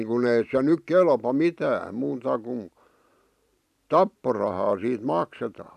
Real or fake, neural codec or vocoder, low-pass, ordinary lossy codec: fake; vocoder, 44.1 kHz, 128 mel bands every 512 samples, BigVGAN v2; 14.4 kHz; none